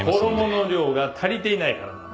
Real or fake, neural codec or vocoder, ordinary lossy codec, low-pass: real; none; none; none